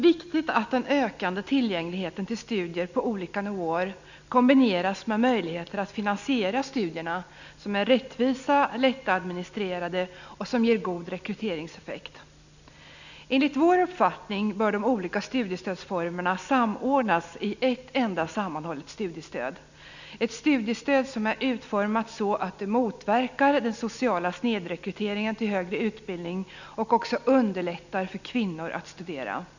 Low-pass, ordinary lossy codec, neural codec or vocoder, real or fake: 7.2 kHz; none; none; real